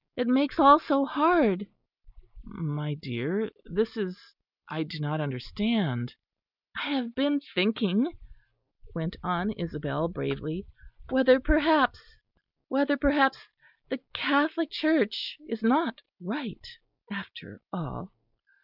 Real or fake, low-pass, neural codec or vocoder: real; 5.4 kHz; none